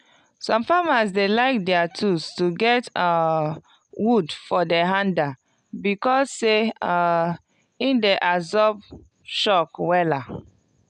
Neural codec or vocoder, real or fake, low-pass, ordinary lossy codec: none; real; 10.8 kHz; none